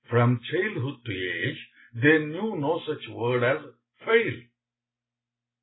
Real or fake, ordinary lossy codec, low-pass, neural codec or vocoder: real; AAC, 16 kbps; 7.2 kHz; none